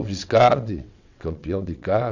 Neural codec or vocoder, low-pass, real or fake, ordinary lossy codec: vocoder, 22.05 kHz, 80 mel bands, WaveNeXt; 7.2 kHz; fake; none